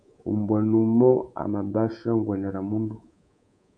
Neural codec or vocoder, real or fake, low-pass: codec, 24 kHz, 3.1 kbps, DualCodec; fake; 9.9 kHz